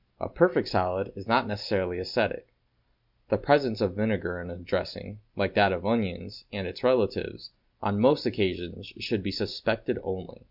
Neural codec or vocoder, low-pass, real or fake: none; 5.4 kHz; real